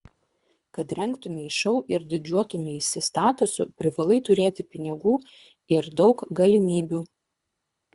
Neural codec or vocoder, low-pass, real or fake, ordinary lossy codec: codec, 24 kHz, 3 kbps, HILCodec; 10.8 kHz; fake; Opus, 64 kbps